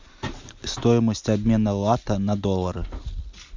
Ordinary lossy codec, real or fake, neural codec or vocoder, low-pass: MP3, 64 kbps; real; none; 7.2 kHz